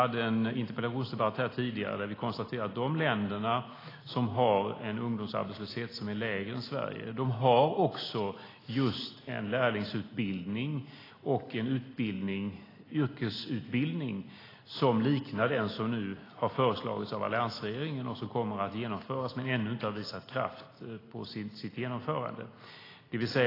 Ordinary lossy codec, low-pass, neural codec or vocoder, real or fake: AAC, 24 kbps; 5.4 kHz; none; real